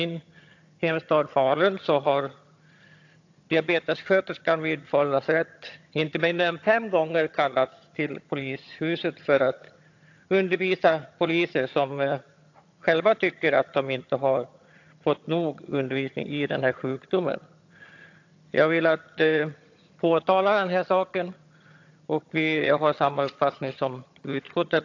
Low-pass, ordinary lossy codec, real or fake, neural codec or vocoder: 7.2 kHz; AAC, 48 kbps; fake; vocoder, 22.05 kHz, 80 mel bands, HiFi-GAN